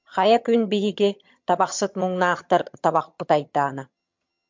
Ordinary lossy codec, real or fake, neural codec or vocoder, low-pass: MP3, 64 kbps; fake; vocoder, 22.05 kHz, 80 mel bands, HiFi-GAN; 7.2 kHz